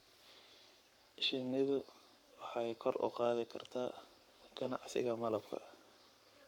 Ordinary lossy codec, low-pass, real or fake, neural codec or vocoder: none; 19.8 kHz; fake; codec, 44.1 kHz, 7.8 kbps, Pupu-Codec